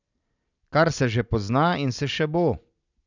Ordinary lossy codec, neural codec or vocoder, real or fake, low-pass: none; none; real; 7.2 kHz